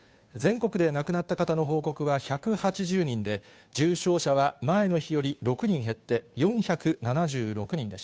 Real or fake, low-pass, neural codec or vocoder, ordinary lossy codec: fake; none; codec, 16 kHz, 2 kbps, FunCodec, trained on Chinese and English, 25 frames a second; none